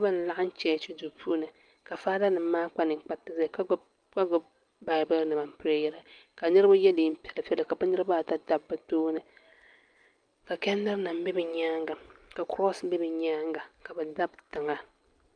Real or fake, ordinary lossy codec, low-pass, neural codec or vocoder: real; Opus, 32 kbps; 9.9 kHz; none